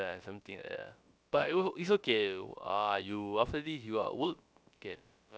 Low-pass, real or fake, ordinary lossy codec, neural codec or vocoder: none; fake; none; codec, 16 kHz, about 1 kbps, DyCAST, with the encoder's durations